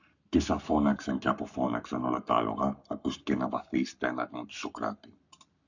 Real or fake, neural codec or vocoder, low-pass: fake; codec, 44.1 kHz, 7.8 kbps, Pupu-Codec; 7.2 kHz